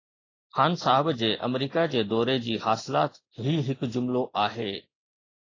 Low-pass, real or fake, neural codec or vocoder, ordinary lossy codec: 7.2 kHz; real; none; AAC, 32 kbps